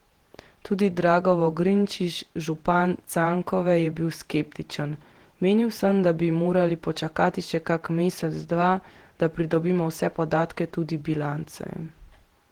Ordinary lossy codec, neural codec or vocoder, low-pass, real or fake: Opus, 16 kbps; vocoder, 48 kHz, 128 mel bands, Vocos; 19.8 kHz; fake